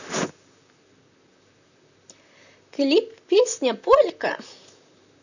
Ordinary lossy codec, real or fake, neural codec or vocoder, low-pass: none; fake; vocoder, 44.1 kHz, 128 mel bands, Pupu-Vocoder; 7.2 kHz